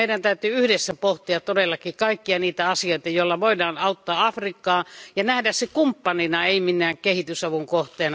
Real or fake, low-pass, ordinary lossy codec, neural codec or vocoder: real; none; none; none